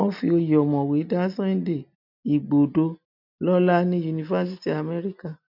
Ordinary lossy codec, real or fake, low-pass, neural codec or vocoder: none; real; 5.4 kHz; none